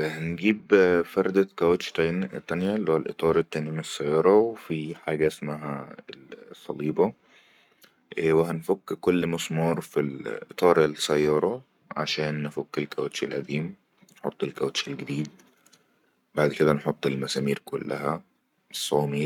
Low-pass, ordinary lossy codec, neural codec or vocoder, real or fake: 19.8 kHz; none; codec, 44.1 kHz, 7.8 kbps, Pupu-Codec; fake